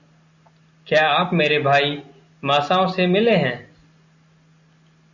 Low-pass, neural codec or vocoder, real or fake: 7.2 kHz; none; real